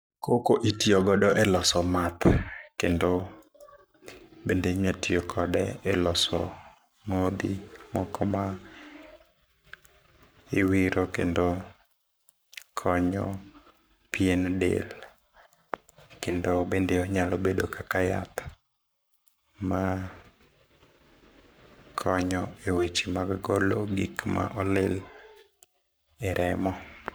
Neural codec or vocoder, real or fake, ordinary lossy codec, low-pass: codec, 44.1 kHz, 7.8 kbps, Pupu-Codec; fake; none; none